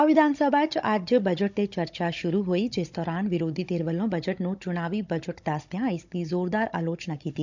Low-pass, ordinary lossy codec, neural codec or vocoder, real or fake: 7.2 kHz; none; codec, 16 kHz, 16 kbps, FunCodec, trained on Chinese and English, 50 frames a second; fake